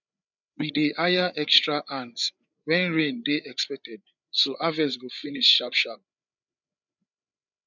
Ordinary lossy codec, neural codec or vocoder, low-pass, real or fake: none; codec, 16 kHz, 8 kbps, FreqCodec, larger model; 7.2 kHz; fake